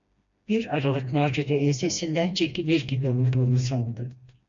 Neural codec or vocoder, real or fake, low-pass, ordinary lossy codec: codec, 16 kHz, 1 kbps, FreqCodec, smaller model; fake; 7.2 kHz; MP3, 48 kbps